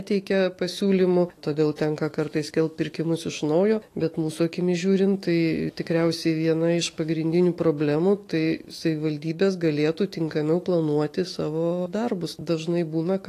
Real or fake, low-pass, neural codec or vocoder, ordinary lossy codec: fake; 14.4 kHz; autoencoder, 48 kHz, 128 numbers a frame, DAC-VAE, trained on Japanese speech; AAC, 48 kbps